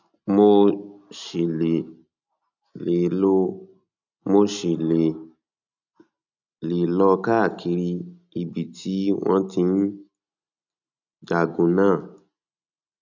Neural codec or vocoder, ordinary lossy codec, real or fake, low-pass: none; none; real; 7.2 kHz